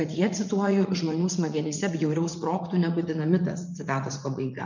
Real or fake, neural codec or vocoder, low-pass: fake; vocoder, 44.1 kHz, 80 mel bands, Vocos; 7.2 kHz